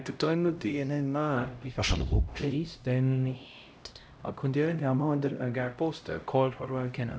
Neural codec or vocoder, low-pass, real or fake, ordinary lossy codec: codec, 16 kHz, 0.5 kbps, X-Codec, HuBERT features, trained on LibriSpeech; none; fake; none